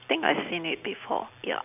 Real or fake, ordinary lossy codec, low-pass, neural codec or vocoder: real; none; 3.6 kHz; none